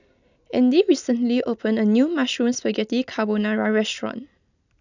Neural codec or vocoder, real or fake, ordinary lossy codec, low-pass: none; real; none; 7.2 kHz